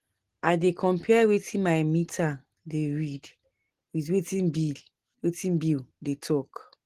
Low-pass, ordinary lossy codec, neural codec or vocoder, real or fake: 14.4 kHz; Opus, 16 kbps; none; real